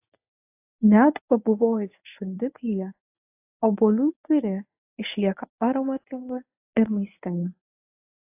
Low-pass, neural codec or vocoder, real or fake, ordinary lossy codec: 3.6 kHz; codec, 24 kHz, 0.9 kbps, WavTokenizer, medium speech release version 1; fake; AAC, 32 kbps